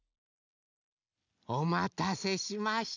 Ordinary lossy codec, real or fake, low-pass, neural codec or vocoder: none; real; 7.2 kHz; none